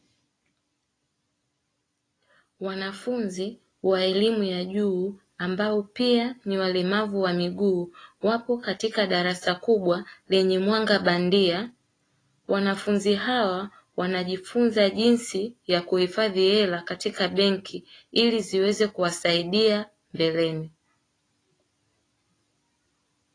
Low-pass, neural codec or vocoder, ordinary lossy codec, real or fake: 9.9 kHz; none; AAC, 32 kbps; real